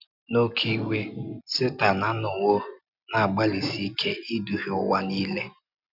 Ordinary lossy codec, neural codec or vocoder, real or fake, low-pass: none; none; real; 5.4 kHz